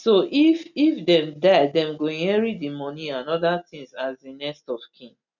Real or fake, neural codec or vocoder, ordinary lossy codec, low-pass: real; none; none; 7.2 kHz